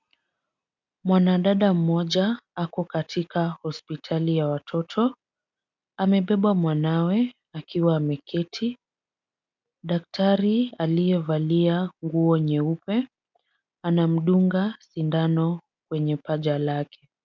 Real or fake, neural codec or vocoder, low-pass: real; none; 7.2 kHz